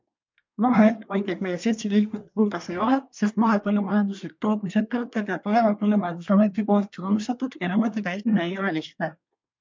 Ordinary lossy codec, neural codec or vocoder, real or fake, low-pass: MP3, 64 kbps; codec, 24 kHz, 1 kbps, SNAC; fake; 7.2 kHz